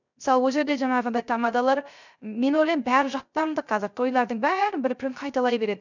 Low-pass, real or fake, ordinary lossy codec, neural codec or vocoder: 7.2 kHz; fake; none; codec, 16 kHz, 0.3 kbps, FocalCodec